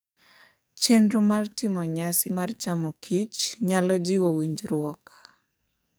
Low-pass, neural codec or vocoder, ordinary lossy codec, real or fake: none; codec, 44.1 kHz, 2.6 kbps, SNAC; none; fake